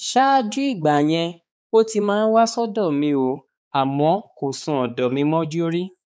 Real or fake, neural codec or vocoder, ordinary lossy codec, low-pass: fake; codec, 16 kHz, 4 kbps, X-Codec, HuBERT features, trained on balanced general audio; none; none